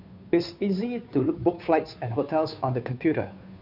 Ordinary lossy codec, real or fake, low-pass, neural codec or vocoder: none; fake; 5.4 kHz; codec, 16 kHz, 2 kbps, FunCodec, trained on Chinese and English, 25 frames a second